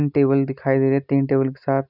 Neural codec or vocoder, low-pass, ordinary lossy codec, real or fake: none; 5.4 kHz; none; real